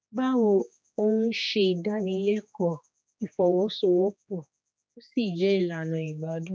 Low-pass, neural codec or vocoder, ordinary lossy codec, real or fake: none; codec, 16 kHz, 2 kbps, X-Codec, HuBERT features, trained on general audio; none; fake